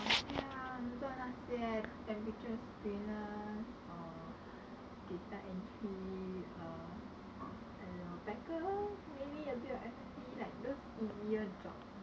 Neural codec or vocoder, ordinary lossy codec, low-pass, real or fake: none; none; none; real